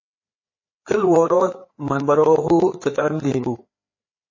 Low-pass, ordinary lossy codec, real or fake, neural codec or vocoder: 7.2 kHz; MP3, 32 kbps; fake; codec, 16 kHz, 4 kbps, FreqCodec, larger model